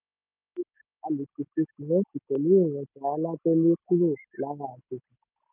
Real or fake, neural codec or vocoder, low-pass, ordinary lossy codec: real; none; 3.6 kHz; none